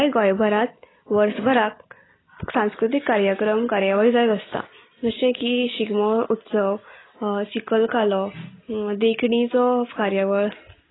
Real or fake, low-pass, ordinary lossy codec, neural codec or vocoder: real; 7.2 kHz; AAC, 16 kbps; none